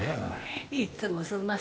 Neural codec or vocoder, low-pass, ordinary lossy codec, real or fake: codec, 16 kHz, 0.8 kbps, ZipCodec; none; none; fake